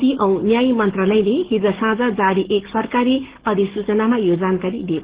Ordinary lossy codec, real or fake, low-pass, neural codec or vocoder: Opus, 16 kbps; fake; 3.6 kHz; codec, 44.1 kHz, 7.8 kbps, DAC